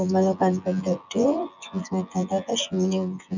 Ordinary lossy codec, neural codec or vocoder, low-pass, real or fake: none; vocoder, 24 kHz, 100 mel bands, Vocos; 7.2 kHz; fake